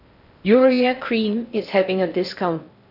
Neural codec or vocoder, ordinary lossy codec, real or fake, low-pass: codec, 16 kHz in and 24 kHz out, 0.6 kbps, FocalCodec, streaming, 4096 codes; none; fake; 5.4 kHz